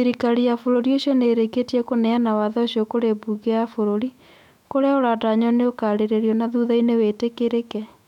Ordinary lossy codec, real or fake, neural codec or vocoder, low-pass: none; fake; autoencoder, 48 kHz, 128 numbers a frame, DAC-VAE, trained on Japanese speech; 19.8 kHz